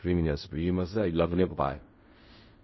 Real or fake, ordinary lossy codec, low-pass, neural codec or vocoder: fake; MP3, 24 kbps; 7.2 kHz; codec, 16 kHz in and 24 kHz out, 0.4 kbps, LongCat-Audio-Codec, fine tuned four codebook decoder